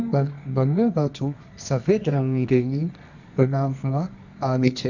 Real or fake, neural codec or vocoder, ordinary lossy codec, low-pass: fake; codec, 24 kHz, 0.9 kbps, WavTokenizer, medium music audio release; none; 7.2 kHz